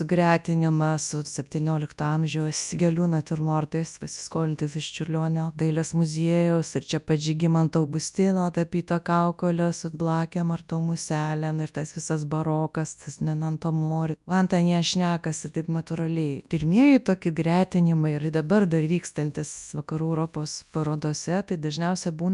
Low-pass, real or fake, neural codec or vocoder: 10.8 kHz; fake; codec, 24 kHz, 0.9 kbps, WavTokenizer, large speech release